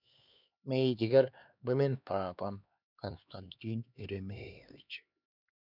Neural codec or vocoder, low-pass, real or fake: codec, 16 kHz, 2 kbps, X-Codec, HuBERT features, trained on LibriSpeech; 5.4 kHz; fake